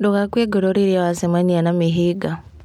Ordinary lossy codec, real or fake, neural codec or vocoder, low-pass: MP3, 96 kbps; real; none; 19.8 kHz